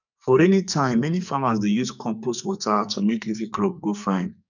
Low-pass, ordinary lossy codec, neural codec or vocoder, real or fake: 7.2 kHz; none; codec, 44.1 kHz, 2.6 kbps, SNAC; fake